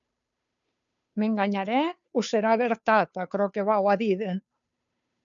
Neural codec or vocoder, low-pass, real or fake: codec, 16 kHz, 2 kbps, FunCodec, trained on Chinese and English, 25 frames a second; 7.2 kHz; fake